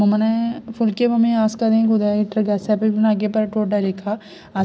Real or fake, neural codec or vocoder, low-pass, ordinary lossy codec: real; none; none; none